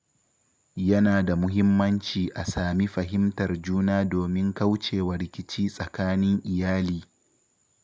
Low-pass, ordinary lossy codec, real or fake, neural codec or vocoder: none; none; real; none